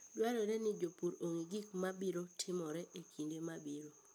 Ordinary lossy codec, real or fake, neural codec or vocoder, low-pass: none; real; none; none